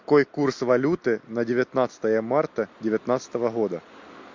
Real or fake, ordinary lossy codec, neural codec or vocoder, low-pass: real; MP3, 48 kbps; none; 7.2 kHz